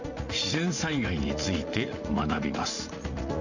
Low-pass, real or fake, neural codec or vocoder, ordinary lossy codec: 7.2 kHz; fake; vocoder, 44.1 kHz, 80 mel bands, Vocos; none